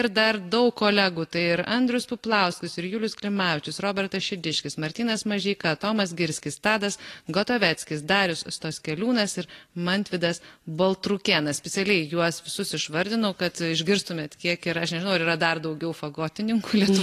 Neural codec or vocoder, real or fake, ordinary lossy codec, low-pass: none; real; AAC, 48 kbps; 14.4 kHz